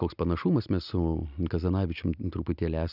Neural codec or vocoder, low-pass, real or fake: none; 5.4 kHz; real